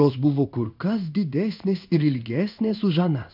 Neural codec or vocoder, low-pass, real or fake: codec, 16 kHz in and 24 kHz out, 1 kbps, XY-Tokenizer; 5.4 kHz; fake